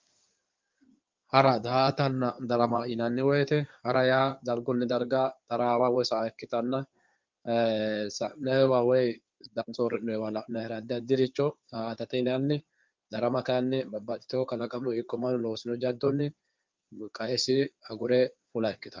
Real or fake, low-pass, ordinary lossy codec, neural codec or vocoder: fake; 7.2 kHz; Opus, 24 kbps; codec, 16 kHz in and 24 kHz out, 2.2 kbps, FireRedTTS-2 codec